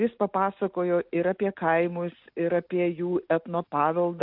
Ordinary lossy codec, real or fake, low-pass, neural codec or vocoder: AAC, 48 kbps; real; 5.4 kHz; none